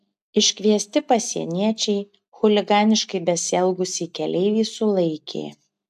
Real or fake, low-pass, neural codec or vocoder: real; 14.4 kHz; none